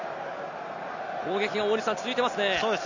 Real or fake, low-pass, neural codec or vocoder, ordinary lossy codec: real; 7.2 kHz; none; none